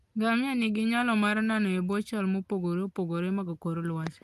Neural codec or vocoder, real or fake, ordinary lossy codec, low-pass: none; real; Opus, 32 kbps; 14.4 kHz